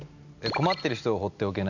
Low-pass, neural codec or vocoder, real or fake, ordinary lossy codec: 7.2 kHz; none; real; none